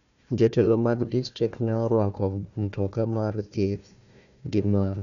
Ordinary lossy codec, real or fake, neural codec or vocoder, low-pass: none; fake; codec, 16 kHz, 1 kbps, FunCodec, trained on Chinese and English, 50 frames a second; 7.2 kHz